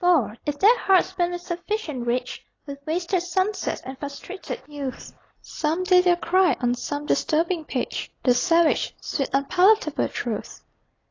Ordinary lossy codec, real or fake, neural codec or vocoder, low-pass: AAC, 32 kbps; real; none; 7.2 kHz